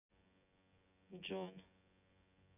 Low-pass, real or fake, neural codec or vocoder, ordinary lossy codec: 3.6 kHz; fake; vocoder, 24 kHz, 100 mel bands, Vocos; none